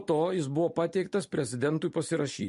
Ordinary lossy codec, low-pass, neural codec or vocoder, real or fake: MP3, 48 kbps; 14.4 kHz; none; real